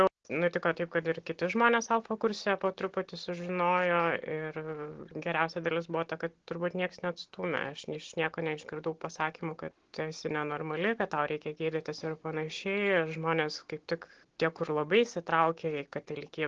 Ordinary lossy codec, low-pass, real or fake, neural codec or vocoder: Opus, 16 kbps; 7.2 kHz; real; none